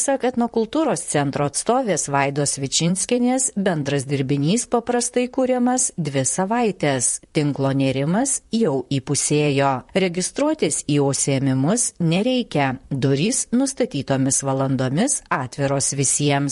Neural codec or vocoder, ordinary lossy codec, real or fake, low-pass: vocoder, 44.1 kHz, 128 mel bands, Pupu-Vocoder; MP3, 48 kbps; fake; 14.4 kHz